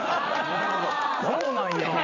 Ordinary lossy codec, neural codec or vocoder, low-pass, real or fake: none; vocoder, 44.1 kHz, 80 mel bands, Vocos; 7.2 kHz; fake